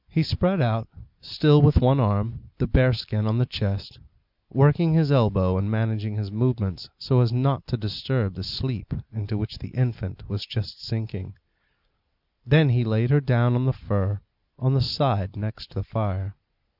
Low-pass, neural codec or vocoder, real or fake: 5.4 kHz; none; real